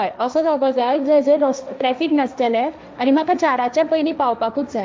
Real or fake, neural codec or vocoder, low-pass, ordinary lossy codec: fake; codec, 16 kHz, 1.1 kbps, Voila-Tokenizer; none; none